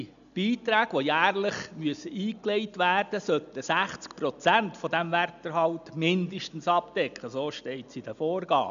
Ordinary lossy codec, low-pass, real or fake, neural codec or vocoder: none; 7.2 kHz; real; none